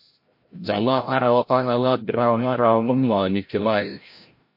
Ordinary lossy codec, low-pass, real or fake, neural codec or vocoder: MP3, 32 kbps; 5.4 kHz; fake; codec, 16 kHz, 0.5 kbps, FreqCodec, larger model